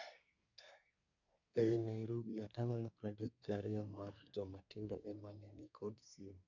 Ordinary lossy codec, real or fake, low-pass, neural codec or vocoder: none; fake; 7.2 kHz; codec, 24 kHz, 1 kbps, SNAC